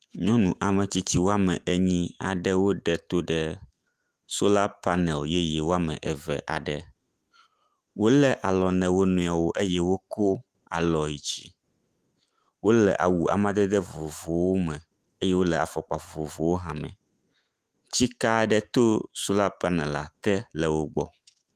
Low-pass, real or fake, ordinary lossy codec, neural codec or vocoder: 14.4 kHz; fake; Opus, 24 kbps; autoencoder, 48 kHz, 128 numbers a frame, DAC-VAE, trained on Japanese speech